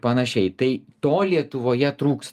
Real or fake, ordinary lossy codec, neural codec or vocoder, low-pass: real; Opus, 32 kbps; none; 14.4 kHz